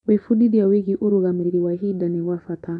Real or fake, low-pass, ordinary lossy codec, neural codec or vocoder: fake; 10.8 kHz; MP3, 96 kbps; vocoder, 24 kHz, 100 mel bands, Vocos